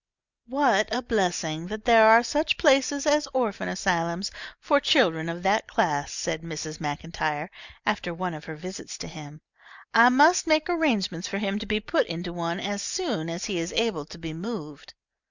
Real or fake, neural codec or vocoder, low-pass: real; none; 7.2 kHz